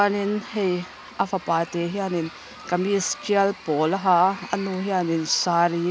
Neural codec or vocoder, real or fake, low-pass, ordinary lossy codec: none; real; none; none